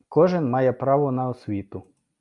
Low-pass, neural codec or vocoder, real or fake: 10.8 kHz; none; real